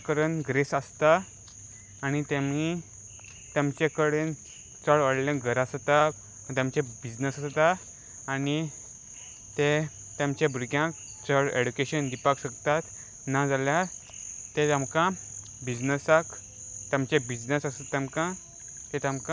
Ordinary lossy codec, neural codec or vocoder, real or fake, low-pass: none; none; real; none